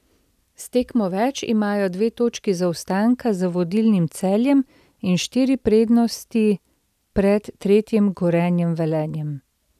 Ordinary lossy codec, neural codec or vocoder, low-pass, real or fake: none; none; 14.4 kHz; real